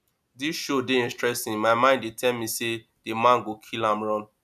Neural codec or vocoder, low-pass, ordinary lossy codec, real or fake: none; 14.4 kHz; none; real